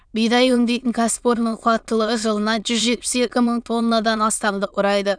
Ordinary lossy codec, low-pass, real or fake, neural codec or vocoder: none; 9.9 kHz; fake; autoencoder, 22.05 kHz, a latent of 192 numbers a frame, VITS, trained on many speakers